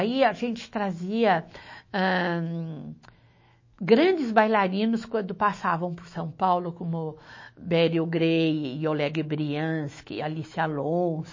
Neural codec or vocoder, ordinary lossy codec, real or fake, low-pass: autoencoder, 48 kHz, 128 numbers a frame, DAC-VAE, trained on Japanese speech; MP3, 32 kbps; fake; 7.2 kHz